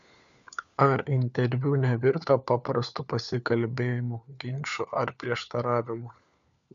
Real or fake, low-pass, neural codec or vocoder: fake; 7.2 kHz; codec, 16 kHz, 4 kbps, FunCodec, trained on LibriTTS, 50 frames a second